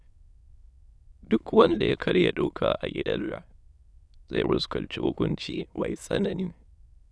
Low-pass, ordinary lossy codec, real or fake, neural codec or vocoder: none; none; fake; autoencoder, 22.05 kHz, a latent of 192 numbers a frame, VITS, trained on many speakers